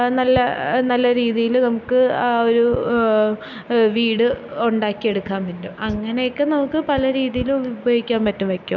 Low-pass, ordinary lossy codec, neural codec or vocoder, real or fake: 7.2 kHz; none; none; real